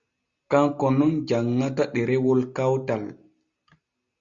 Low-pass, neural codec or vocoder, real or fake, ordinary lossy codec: 7.2 kHz; none; real; Opus, 64 kbps